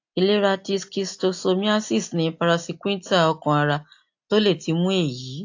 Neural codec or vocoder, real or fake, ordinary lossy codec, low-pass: none; real; AAC, 48 kbps; 7.2 kHz